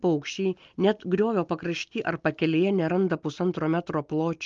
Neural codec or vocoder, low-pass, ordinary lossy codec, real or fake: none; 7.2 kHz; Opus, 32 kbps; real